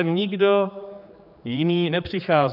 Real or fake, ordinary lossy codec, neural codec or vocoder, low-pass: fake; AAC, 48 kbps; codec, 16 kHz, 4 kbps, X-Codec, HuBERT features, trained on general audio; 5.4 kHz